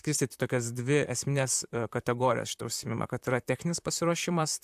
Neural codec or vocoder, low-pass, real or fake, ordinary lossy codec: vocoder, 44.1 kHz, 128 mel bands, Pupu-Vocoder; 14.4 kHz; fake; AAC, 96 kbps